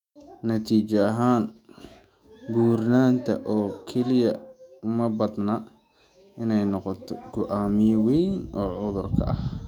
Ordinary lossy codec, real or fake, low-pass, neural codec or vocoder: none; fake; 19.8 kHz; autoencoder, 48 kHz, 128 numbers a frame, DAC-VAE, trained on Japanese speech